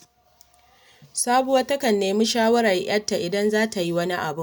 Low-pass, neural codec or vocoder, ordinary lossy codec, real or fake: none; none; none; real